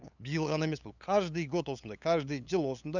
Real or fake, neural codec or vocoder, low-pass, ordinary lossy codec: fake; codec, 16 kHz, 8 kbps, FunCodec, trained on LibriTTS, 25 frames a second; 7.2 kHz; none